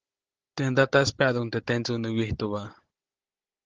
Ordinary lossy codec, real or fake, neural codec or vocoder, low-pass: Opus, 16 kbps; fake; codec, 16 kHz, 16 kbps, FunCodec, trained on Chinese and English, 50 frames a second; 7.2 kHz